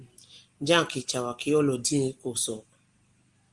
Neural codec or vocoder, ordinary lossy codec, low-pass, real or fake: none; Opus, 24 kbps; 10.8 kHz; real